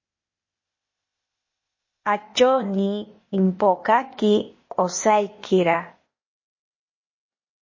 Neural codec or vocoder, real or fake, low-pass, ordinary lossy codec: codec, 16 kHz, 0.8 kbps, ZipCodec; fake; 7.2 kHz; MP3, 32 kbps